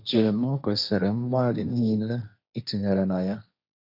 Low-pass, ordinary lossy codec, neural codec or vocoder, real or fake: 5.4 kHz; none; codec, 16 kHz, 1.1 kbps, Voila-Tokenizer; fake